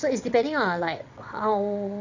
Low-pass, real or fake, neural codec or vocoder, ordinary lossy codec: 7.2 kHz; fake; vocoder, 22.05 kHz, 80 mel bands, WaveNeXt; none